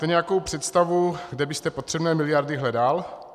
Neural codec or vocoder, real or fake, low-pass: none; real; 14.4 kHz